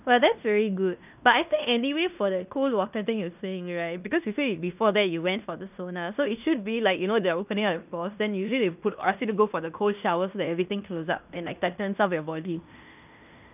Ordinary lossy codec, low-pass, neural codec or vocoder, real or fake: none; 3.6 kHz; codec, 16 kHz in and 24 kHz out, 0.9 kbps, LongCat-Audio-Codec, fine tuned four codebook decoder; fake